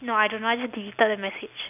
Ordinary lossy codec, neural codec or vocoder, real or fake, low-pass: none; none; real; 3.6 kHz